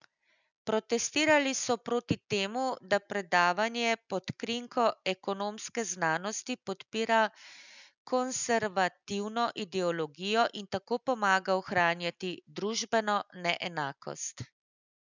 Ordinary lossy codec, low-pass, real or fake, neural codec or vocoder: none; 7.2 kHz; real; none